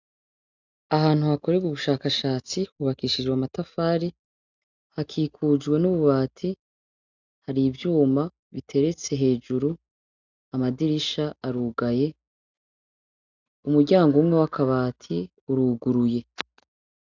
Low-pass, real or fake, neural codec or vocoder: 7.2 kHz; real; none